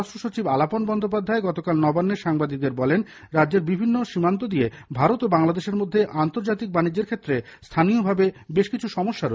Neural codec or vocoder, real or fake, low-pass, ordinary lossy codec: none; real; none; none